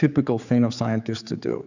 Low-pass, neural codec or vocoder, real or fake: 7.2 kHz; codec, 16 kHz, 4 kbps, X-Codec, HuBERT features, trained on general audio; fake